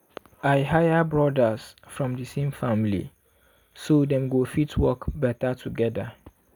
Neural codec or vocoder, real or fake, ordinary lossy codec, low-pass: none; real; none; none